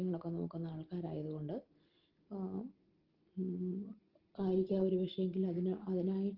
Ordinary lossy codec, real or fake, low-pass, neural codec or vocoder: Opus, 16 kbps; real; 5.4 kHz; none